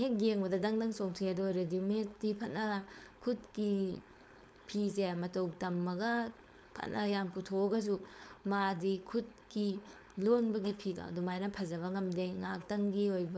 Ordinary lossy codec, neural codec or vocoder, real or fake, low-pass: none; codec, 16 kHz, 4.8 kbps, FACodec; fake; none